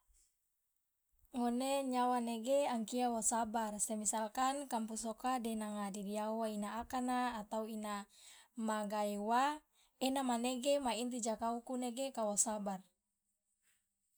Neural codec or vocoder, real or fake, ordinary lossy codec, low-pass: none; real; none; none